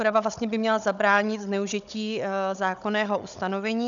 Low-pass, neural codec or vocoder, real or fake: 7.2 kHz; codec, 16 kHz, 16 kbps, FunCodec, trained on Chinese and English, 50 frames a second; fake